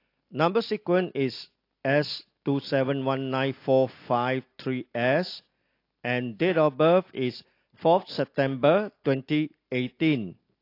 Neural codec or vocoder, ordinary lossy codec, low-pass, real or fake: none; AAC, 32 kbps; 5.4 kHz; real